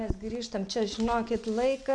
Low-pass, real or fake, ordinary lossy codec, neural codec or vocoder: 9.9 kHz; real; MP3, 96 kbps; none